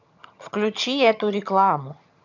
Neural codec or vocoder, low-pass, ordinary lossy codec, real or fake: vocoder, 22.05 kHz, 80 mel bands, HiFi-GAN; 7.2 kHz; none; fake